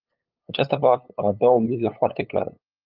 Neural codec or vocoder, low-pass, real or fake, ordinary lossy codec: codec, 16 kHz, 8 kbps, FunCodec, trained on LibriTTS, 25 frames a second; 5.4 kHz; fake; Opus, 24 kbps